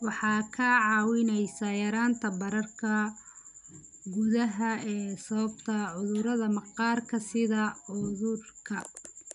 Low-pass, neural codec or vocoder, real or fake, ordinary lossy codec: 10.8 kHz; none; real; none